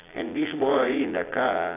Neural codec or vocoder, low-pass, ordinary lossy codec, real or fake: vocoder, 22.05 kHz, 80 mel bands, Vocos; 3.6 kHz; AAC, 24 kbps; fake